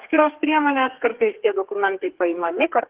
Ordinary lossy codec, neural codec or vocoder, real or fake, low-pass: Opus, 16 kbps; codec, 32 kHz, 1.9 kbps, SNAC; fake; 3.6 kHz